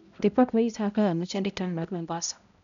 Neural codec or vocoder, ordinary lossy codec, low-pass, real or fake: codec, 16 kHz, 0.5 kbps, X-Codec, HuBERT features, trained on balanced general audio; none; 7.2 kHz; fake